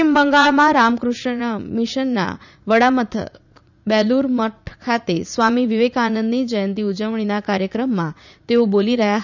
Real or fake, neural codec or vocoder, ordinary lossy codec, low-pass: fake; vocoder, 44.1 kHz, 80 mel bands, Vocos; none; 7.2 kHz